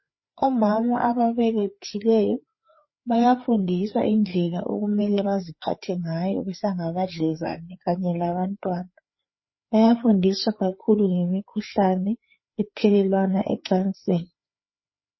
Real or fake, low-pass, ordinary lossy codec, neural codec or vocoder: fake; 7.2 kHz; MP3, 24 kbps; codec, 16 kHz, 4 kbps, FreqCodec, larger model